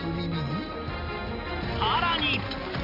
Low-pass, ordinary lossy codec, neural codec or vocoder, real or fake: 5.4 kHz; none; none; real